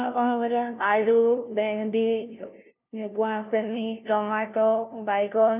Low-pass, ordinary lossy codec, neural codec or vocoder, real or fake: 3.6 kHz; none; codec, 16 kHz, 0.5 kbps, FunCodec, trained on LibriTTS, 25 frames a second; fake